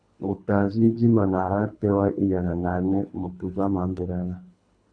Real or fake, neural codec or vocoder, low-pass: fake; codec, 24 kHz, 3 kbps, HILCodec; 9.9 kHz